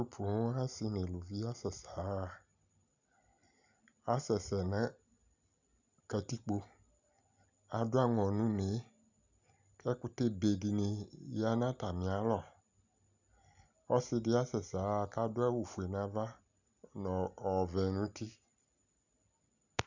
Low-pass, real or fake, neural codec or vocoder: 7.2 kHz; real; none